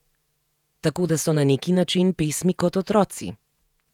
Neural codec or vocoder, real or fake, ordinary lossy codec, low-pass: vocoder, 48 kHz, 128 mel bands, Vocos; fake; none; 19.8 kHz